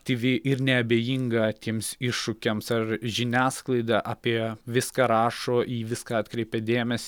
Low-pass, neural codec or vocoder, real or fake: 19.8 kHz; none; real